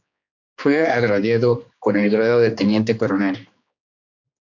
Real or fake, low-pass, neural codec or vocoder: fake; 7.2 kHz; codec, 16 kHz, 2 kbps, X-Codec, HuBERT features, trained on general audio